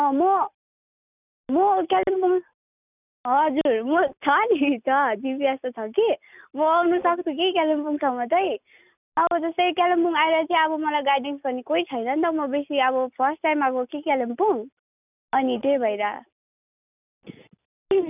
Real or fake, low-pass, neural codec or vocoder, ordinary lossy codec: real; 3.6 kHz; none; none